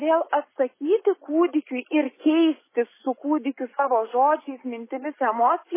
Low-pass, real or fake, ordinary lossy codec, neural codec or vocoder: 3.6 kHz; real; MP3, 16 kbps; none